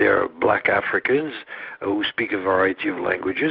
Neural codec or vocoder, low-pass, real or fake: none; 5.4 kHz; real